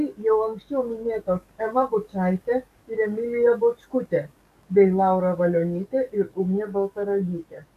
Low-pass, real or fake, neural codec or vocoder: 14.4 kHz; fake; codec, 44.1 kHz, 7.8 kbps, DAC